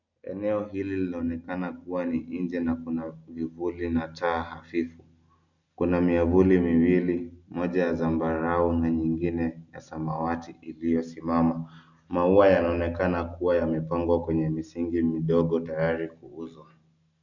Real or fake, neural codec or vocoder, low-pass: real; none; 7.2 kHz